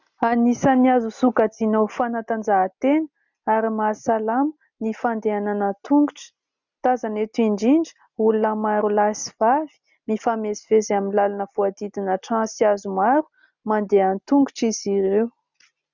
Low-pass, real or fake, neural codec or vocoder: 7.2 kHz; real; none